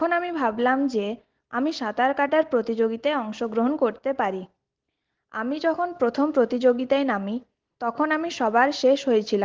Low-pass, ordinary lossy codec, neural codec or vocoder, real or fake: 7.2 kHz; Opus, 16 kbps; none; real